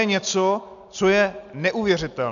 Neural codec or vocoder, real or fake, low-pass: none; real; 7.2 kHz